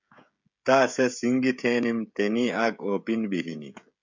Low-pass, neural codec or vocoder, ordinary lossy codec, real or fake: 7.2 kHz; codec, 16 kHz, 16 kbps, FreqCodec, smaller model; MP3, 64 kbps; fake